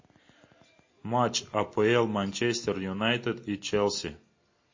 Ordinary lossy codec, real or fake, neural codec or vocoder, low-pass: MP3, 32 kbps; real; none; 7.2 kHz